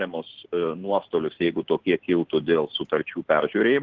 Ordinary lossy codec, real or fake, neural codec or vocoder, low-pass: Opus, 16 kbps; real; none; 7.2 kHz